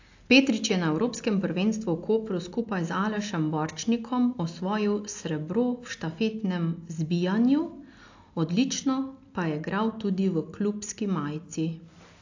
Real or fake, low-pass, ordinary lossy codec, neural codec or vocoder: real; 7.2 kHz; AAC, 48 kbps; none